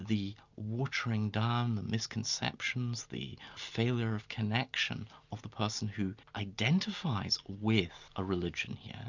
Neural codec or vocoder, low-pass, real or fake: none; 7.2 kHz; real